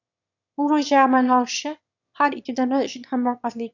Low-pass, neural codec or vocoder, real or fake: 7.2 kHz; autoencoder, 22.05 kHz, a latent of 192 numbers a frame, VITS, trained on one speaker; fake